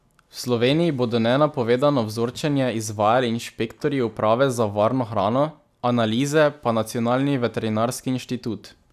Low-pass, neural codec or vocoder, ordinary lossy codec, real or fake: 14.4 kHz; none; none; real